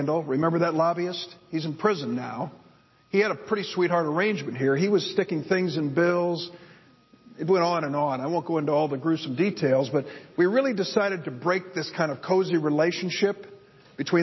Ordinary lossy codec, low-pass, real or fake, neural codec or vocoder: MP3, 24 kbps; 7.2 kHz; real; none